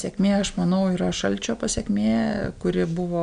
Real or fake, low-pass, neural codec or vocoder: real; 9.9 kHz; none